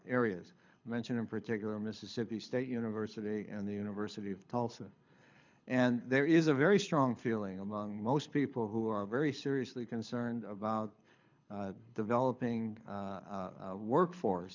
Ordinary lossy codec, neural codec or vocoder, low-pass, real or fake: MP3, 64 kbps; codec, 24 kHz, 6 kbps, HILCodec; 7.2 kHz; fake